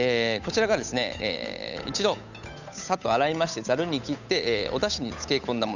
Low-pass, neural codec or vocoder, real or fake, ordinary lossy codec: 7.2 kHz; codec, 16 kHz, 8 kbps, FunCodec, trained on Chinese and English, 25 frames a second; fake; none